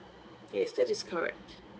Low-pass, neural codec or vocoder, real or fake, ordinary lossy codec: none; codec, 16 kHz, 4 kbps, X-Codec, HuBERT features, trained on balanced general audio; fake; none